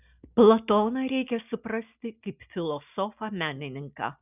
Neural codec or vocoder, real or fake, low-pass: none; real; 3.6 kHz